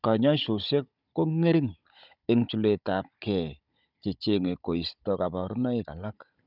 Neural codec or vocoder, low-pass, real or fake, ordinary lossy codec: codec, 16 kHz, 16 kbps, FunCodec, trained on Chinese and English, 50 frames a second; 5.4 kHz; fake; none